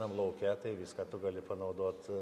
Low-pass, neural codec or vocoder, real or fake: 14.4 kHz; none; real